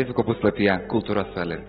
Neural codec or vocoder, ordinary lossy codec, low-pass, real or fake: none; AAC, 16 kbps; 19.8 kHz; real